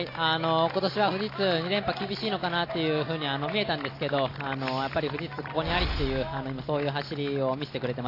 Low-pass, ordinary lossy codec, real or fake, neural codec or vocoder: 5.4 kHz; AAC, 32 kbps; real; none